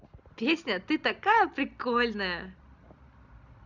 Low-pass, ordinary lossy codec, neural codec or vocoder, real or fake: 7.2 kHz; none; none; real